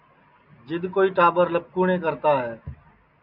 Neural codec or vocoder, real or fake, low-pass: none; real; 5.4 kHz